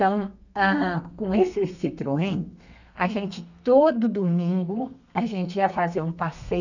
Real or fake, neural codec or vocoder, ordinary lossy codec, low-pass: fake; codec, 32 kHz, 1.9 kbps, SNAC; none; 7.2 kHz